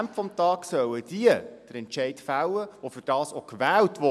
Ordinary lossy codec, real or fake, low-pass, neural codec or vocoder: none; real; none; none